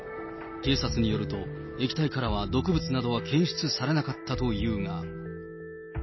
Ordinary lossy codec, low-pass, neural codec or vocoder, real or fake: MP3, 24 kbps; 7.2 kHz; none; real